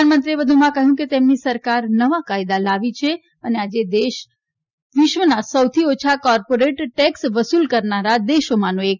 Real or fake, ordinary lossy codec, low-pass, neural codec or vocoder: real; none; 7.2 kHz; none